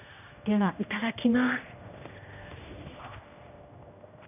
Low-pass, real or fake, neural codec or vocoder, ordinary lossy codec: 3.6 kHz; fake; codec, 16 kHz, 1 kbps, X-Codec, HuBERT features, trained on general audio; none